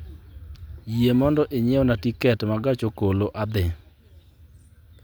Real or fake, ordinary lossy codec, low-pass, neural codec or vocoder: real; none; none; none